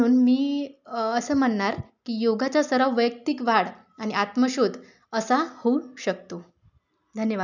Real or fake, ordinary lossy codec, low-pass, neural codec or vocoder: real; none; 7.2 kHz; none